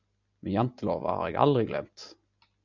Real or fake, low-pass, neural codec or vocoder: real; 7.2 kHz; none